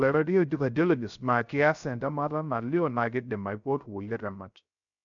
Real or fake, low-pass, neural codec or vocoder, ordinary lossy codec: fake; 7.2 kHz; codec, 16 kHz, 0.3 kbps, FocalCodec; none